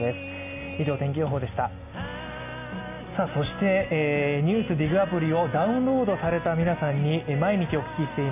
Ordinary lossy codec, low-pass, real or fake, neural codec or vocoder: none; 3.6 kHz; real; none